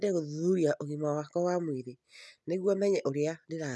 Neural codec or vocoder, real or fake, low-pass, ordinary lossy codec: none; real; none; none